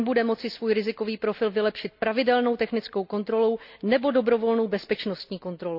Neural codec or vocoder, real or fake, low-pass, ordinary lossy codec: none; real; 5.4 kHz; none